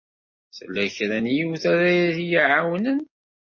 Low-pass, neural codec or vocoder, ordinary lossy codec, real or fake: 7.2 kHz; none; MP3, 32 kbps; real